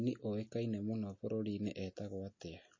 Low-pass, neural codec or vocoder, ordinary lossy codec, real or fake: 7.2 kHz; none; MP3, 32 kbps; real